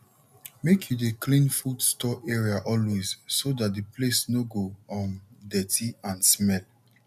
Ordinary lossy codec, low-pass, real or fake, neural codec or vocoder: none; 14.4 kHz; real; none